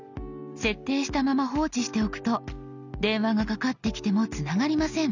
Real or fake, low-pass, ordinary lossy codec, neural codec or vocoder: real; 7.2 kHz; none; none